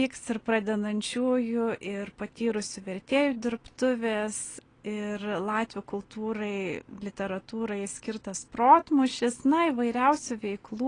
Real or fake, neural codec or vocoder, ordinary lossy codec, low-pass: real; none; AAC, 32 kbps; 9.9 kHz